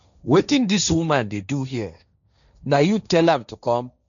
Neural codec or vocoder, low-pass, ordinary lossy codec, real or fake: codec, 16 kHz, 1.1 kbps, Voila-Tokenizer; 7.2 kHz; none; fake